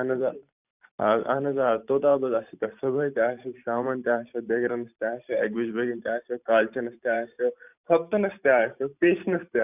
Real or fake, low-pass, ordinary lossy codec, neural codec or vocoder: fake; 3.6 kHz; none; vocoder, 44.1 kHz, 128 mel bands every 512 samples, BigVGAN v2